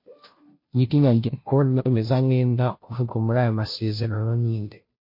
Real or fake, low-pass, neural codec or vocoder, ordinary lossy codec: fake; 5.4 kHz; codec, 16 kHz, 0.5 kbps, FunCodec, trained on Chinese and English, 25 frames a second; MP3, 32 kbps